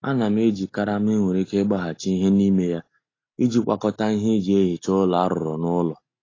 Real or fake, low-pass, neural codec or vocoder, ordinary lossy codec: real; 7.2 kHz; none; AAC, 32 kbps